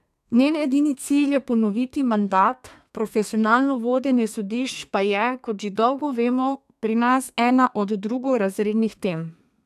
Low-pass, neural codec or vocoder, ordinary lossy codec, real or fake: 14.4 kHz; codec, 32 kHz, 1.9 kbps, SNAC; none; fake